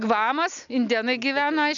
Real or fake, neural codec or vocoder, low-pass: real; none; 7.2 kHz